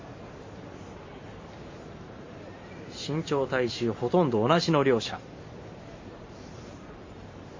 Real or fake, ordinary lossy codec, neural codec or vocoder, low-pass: fake; MP3, 32 kbps; vocoder, 44.1 kHz, 128 mel bands every 512 samples, BigVGAN v2; 7.2 kHz